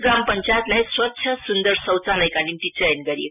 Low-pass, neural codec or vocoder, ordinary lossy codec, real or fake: 3.6 kHz; none; none; real